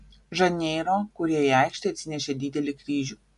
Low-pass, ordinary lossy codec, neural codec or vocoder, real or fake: 10.8 kHz; MP3, 64 kbps; none; real